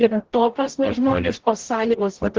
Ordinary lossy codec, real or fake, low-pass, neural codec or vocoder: Opus, 16 kbps; fake; 7.2 kHz; codec, 44.1 kHz, 0.9 kbps, DAC